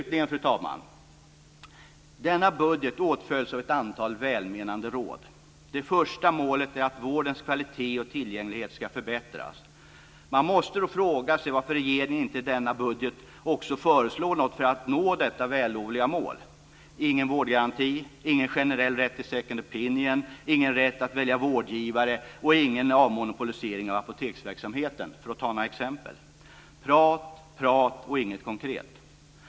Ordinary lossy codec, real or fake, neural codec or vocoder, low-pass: none; real; none; none